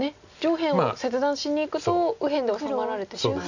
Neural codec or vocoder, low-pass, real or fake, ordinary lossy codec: none; 7.2 kHz; real; none